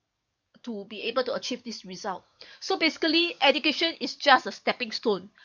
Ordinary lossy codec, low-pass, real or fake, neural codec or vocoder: none; 7.2 kHz; fake; codec, 44.1 kHz, 7.8 kbps, DAC